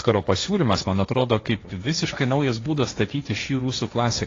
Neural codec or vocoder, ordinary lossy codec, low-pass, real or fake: codec, 16 kHz, 1.1 kbps, Voila-Tokenizer; AAC, 32 kbps; 7.2 kHz; fake